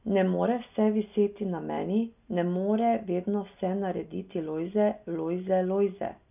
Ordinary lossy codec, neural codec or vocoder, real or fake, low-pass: none; none; real; 3.6 kHz